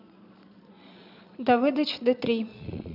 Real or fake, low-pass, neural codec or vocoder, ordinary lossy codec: fake; 5.4 kHz; vocoder, 22.05 kHz, 80 mel bands, Vocos; none